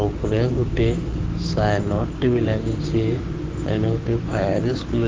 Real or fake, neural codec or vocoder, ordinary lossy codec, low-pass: fake; codec, 16 kHz, 6 kbps, DAC; Opus, 16 kbps; 7.2 kHz